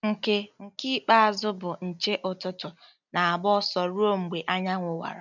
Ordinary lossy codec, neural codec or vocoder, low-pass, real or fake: none; none; 7.2 kHz; real